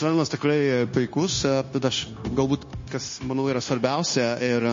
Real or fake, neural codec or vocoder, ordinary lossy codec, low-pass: fake; codec, 16 kHz, 0.9 kbps, LongCat-Audio-Codec; MP3, 32 kbps; 7.2 kHz